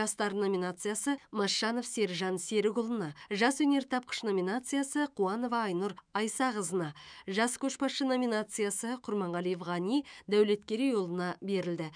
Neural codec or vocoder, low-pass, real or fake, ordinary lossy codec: autoencoder, 48 kHz, 128 numbers a frame, DAC-VAE, trained on Japanese speech; 9.9 kHz; fake; none